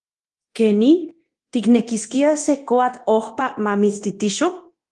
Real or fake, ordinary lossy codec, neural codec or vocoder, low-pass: fake; Opus, 24 kbps; codec, 24 kHz, 0.9 kbps, DualCodec; 10.8 kHz